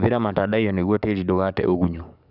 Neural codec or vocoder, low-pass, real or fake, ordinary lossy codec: codec, 44.1 kHz, 7.8 kbps, DAC; 5.4 kHz; fake; none